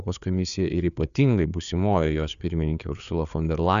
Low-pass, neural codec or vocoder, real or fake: 7.2 kHz; codec, 16 kHz, 4 kbps, FreqCodec, larger model; fake